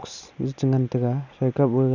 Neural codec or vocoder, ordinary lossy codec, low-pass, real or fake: none; Opus, 64 kbps; 7.2 kHz; real